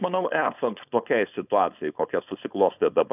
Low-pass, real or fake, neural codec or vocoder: 3.6 kHz; fake; codec, 16 kHz, 4.8 kbps, FACodec